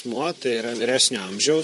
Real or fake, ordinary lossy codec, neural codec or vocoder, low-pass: fake; MP3, 48 kbps; vocoder, 44.1 kHz, 128 mel bands, Pupu-Vocoder; 14.4 kHz